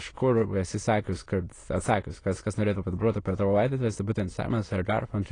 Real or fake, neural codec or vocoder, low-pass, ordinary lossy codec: fake; autoencoder, 22.05 kHz, a latent of 192 numbers a frame, VITS, trained on many speakers; 9.9 kHz; AAC, 32 kbps